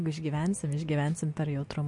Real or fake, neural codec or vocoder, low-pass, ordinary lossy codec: real; none; 10.8 kHz; MP3, 48 kbps